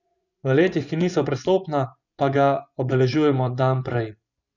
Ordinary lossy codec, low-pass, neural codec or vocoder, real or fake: none; 7.2 kHz; vocoder, 24 kHz, 100 mel bands, Vocos; fake